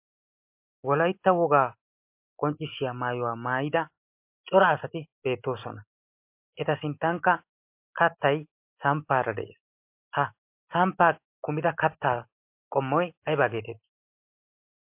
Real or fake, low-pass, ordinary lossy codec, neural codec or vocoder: real; 3.6 kHz; MP3, 32 kbps; none